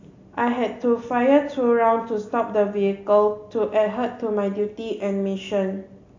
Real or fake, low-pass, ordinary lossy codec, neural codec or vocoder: real; 7.2 kHz; AAC, 48 kbps; none